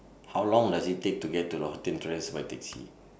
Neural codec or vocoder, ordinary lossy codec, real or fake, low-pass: none; none; real; none